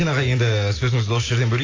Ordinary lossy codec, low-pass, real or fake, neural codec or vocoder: AAC, 32 kbps; 7.2 kHz; real; none